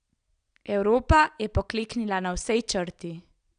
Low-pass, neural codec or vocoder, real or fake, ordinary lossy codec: 9.9 kHz; none; real; none